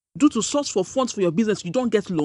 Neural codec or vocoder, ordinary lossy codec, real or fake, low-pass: none; none; real; 10.8 kHz